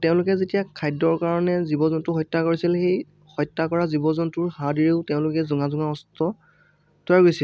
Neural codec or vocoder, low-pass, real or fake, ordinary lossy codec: none; none; real; none